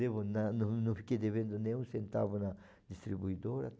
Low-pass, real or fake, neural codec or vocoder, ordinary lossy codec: none; real; none; none